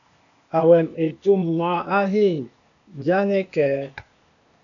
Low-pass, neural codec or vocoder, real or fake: 7.2 kHz; codec, 16 kHz, 0.8 kbps, ZipCodec; fake